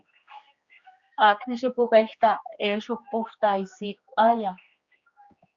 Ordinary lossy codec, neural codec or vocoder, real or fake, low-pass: Opus, 64 kbps; codec, 16 kHz, 2 kbps, X-Codec, HuBERT features, trained on general audio; fake; 7.2 kHz